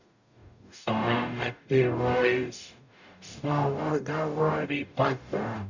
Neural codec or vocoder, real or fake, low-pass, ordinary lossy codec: codec, 44.1 kHz, 0.9 kbps, DAC; fake; 7.2 kHz; none